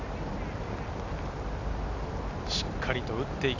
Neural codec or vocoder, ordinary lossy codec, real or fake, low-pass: none; none; real; 7.2 kHz